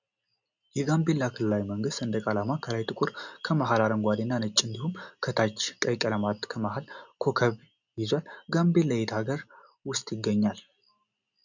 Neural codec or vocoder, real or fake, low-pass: none; real; 7.2 kHz